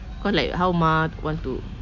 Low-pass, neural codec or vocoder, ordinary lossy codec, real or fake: 7.2 kHz; none; none; real